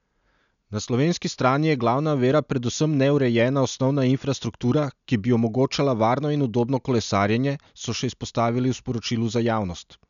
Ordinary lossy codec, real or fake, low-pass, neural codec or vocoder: none; real; 7.2 kHz; none